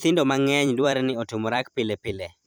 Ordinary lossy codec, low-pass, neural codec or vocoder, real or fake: none; none; vocoder, 44.1 kHz, 128 mel bands every 256 samples, BigVGAN v2; fake